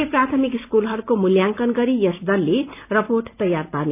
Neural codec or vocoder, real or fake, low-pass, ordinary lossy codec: none; real; 3.6 kHz; none